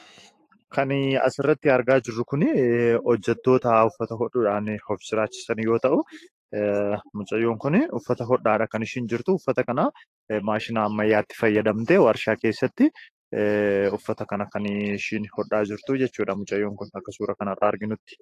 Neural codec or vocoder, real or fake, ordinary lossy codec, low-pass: autoencoder, 48 kHz, 128 numbers a frame, DAC-VAE, trained on Japanese speech; fake; AAC, 48 kbps; 14.4 kHz